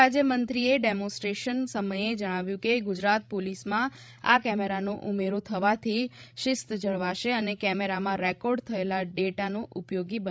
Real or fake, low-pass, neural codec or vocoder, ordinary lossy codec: fake; none; codec, 16 kHz, 16 kbps, FreqCodec, larger model; none